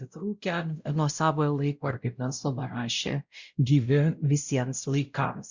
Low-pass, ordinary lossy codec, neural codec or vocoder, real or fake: 7.2 kHz; Opus, 64 kbps; codec, 16 kHz, 0.5 kbps, X-Codec, WavLM features, trained on Multilingual LibriSpeech; fake